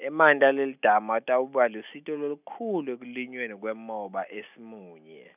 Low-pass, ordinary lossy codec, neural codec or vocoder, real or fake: 3.6 kHz; none; none; real